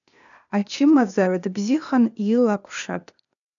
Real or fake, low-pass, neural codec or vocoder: fake; 7.2 kHz; codec, 16 kHz, 0.8 kbps, ZipCodec